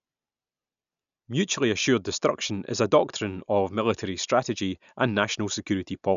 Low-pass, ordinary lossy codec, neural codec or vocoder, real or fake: 7.2 kHz; none; none; real